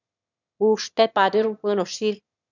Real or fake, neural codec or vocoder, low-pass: fake; autoencoder, 22.05 kHz, a latent of 192 numbers a frame, VITS, trained on one speaker; 7.2 kHz